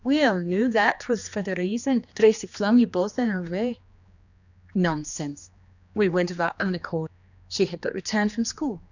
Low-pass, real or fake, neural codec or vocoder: 7.2 kHz; fake; codec, 16 kHz, 2 kbps, X-Codec, HuBERT features, trained on general audio